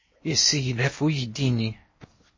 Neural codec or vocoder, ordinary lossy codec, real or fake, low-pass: codec, 16 kHz in and 24 kHz out, 0.8 kbps, FocalCodec, streaming, 65536 codes; MP3, 32 kbps; fake; 7.2 kHz